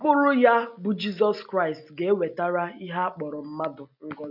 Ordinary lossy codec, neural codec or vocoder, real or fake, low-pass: none; none; real; 5.4 kHz